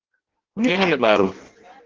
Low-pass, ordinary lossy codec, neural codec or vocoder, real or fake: 7.2 kHz; Opus, 16 kbps; codec, 16 kHz in and 24 kHz out, 0.6 kbps, FireRedTTS-2 codec; fake